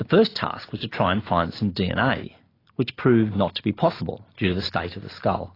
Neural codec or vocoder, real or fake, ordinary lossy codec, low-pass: none; real; AAC, 24 kbps; 5.4 kHz